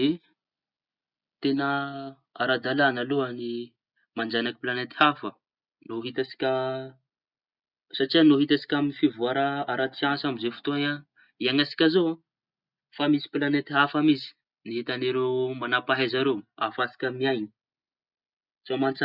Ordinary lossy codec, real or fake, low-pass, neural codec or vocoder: Opus, 64 kbps; real; 5.4 kHz; none